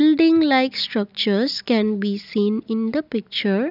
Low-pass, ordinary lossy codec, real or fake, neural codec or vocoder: 5.4 kHz; none; real; none